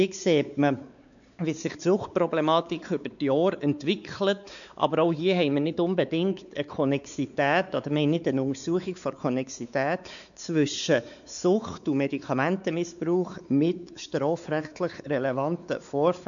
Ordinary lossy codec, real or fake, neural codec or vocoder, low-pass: none; fake; codec, 16 kHz, 4 kbps, X-Codec, WavLM features, trained on Multilingual LibriSpeech; 7.2 kHz